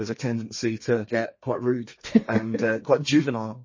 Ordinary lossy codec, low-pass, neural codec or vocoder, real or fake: MP3, 32 kbps; 7.2 kHz; codec, 32 kHz, 1.9 kbps, SNAC; fake